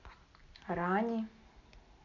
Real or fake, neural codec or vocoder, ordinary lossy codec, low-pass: real; none; none; 7.2 kHz